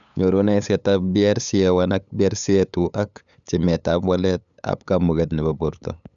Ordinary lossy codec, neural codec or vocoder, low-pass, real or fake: none; codec, 16 kHz, 8 kbps, FunCodec, trained on LibriTTS, 25 frames a second; 7.2 kHz; fake